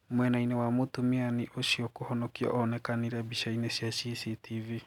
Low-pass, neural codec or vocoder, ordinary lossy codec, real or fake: 19.8 kHz; none; none; real